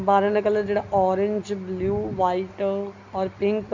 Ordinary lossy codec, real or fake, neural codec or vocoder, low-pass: MP3, 64 kbps; real; none; 7.2 kHz